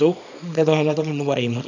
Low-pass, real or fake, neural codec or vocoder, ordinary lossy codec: 7.2 kHz; fake; codec, 24 kHz, 0.9 kbps, WavTokenizer, small release; none